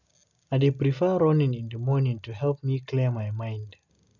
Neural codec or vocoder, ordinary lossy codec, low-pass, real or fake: none; none; 7.2 kHz; real